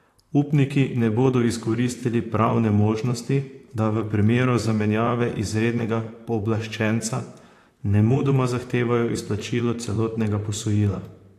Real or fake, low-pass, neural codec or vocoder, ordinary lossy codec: fake; 14.4 kHz; vocoder, 44.1 kHz, 128 mel bands, Pupu-Vocoder; AAC, 64 kbps